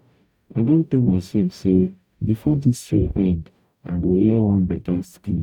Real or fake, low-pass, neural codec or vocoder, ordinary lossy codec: fake; 19.8 kHz; codec, 44.1 kHz, 0.9 kbps, DAC; none